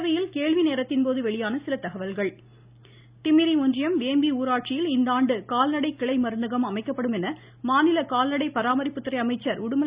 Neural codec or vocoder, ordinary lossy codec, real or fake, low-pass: none; Opus, 64 kbps; real; 3.6 kHz